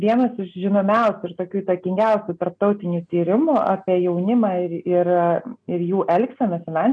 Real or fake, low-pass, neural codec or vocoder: real; 9.9 kHz; none